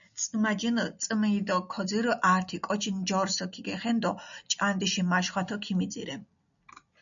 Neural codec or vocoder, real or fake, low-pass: none; real; 7.2 kHz